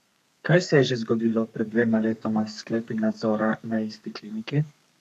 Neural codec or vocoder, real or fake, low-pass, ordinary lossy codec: codec, 32 kHz, 1.9 kbps, SNAC; fake; 14.4 kHz; none